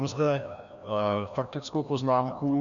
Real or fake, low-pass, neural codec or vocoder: fake; 7.2 kHz; codec, 16 kHz, 1 kbps, FreqCodec, larger model